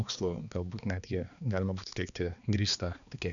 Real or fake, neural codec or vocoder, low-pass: fake; codec, 16 kHz, 2 kbps, X-Codec, HuBERT features, trained on balanced general audio; 7.2 kHz